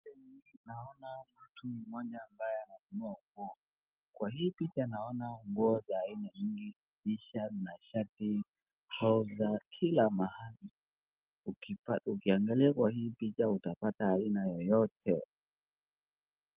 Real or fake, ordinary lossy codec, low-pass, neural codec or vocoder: real; Opus, 32 kbps; 3.6 kHz; none